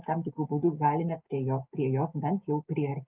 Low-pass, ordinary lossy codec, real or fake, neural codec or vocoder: 3.6 kHz; Opus, 32 kbps; real; none